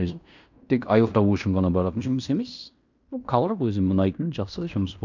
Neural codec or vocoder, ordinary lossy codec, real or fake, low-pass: codec, 16 kHz in and 24 kHz out, 0.9 kbps, LongCat-Audio-Codec, fine tuned four codebook decoder; none; fake; 7.2 kHz